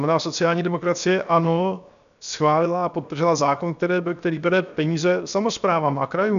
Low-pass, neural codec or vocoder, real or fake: 7.2 kHz; codec, 16 kHz, 0.7 kbps, FocalCodec; fake